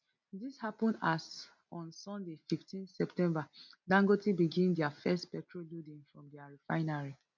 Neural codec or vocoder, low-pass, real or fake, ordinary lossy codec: none; 7.2 kHz; real; none